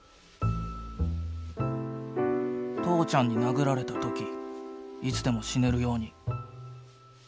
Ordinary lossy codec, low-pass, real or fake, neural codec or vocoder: none; none; real; none